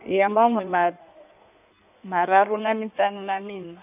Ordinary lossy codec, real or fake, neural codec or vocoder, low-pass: none; fake; codec, 16 kHz in and 24 kHz out, 1.1 kbps, FireRedTTS-2 codec; 3.6 kHz